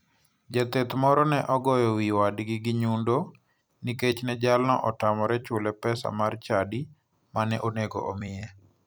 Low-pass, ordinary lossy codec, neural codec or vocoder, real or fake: none; none; vocoder, 44.1 kHz, 128 mel bands every 256 samples, BigVGAN v2; fake